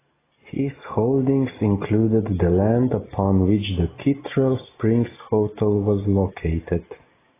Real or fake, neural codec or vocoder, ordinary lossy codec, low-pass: real; none; AAC, 16 kbps; 3.6 kHz